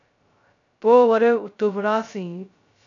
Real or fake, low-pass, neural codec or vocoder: fake; 7.2 kHz; codec, 16 kHz, 0.2 kbps, FocalCodec